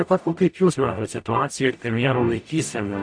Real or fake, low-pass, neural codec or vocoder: fake; 9.9 kHz; codec, 44.1 kHz, 0.9 kbps, DAC